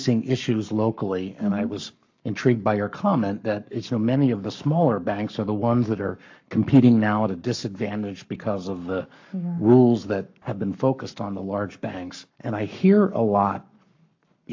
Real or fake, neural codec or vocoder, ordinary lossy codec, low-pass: fake; codec, 44.1 kHz, 7.8 kbps, Pupu-Codec; AAC, 48 kbps; 7.2 kHz